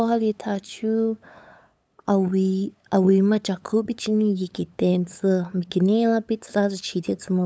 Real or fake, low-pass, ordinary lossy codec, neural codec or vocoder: fake; none; none; codec, 16 kHz, 8 kbps, FunCodec, trained on LibriTTS, 25 frames a second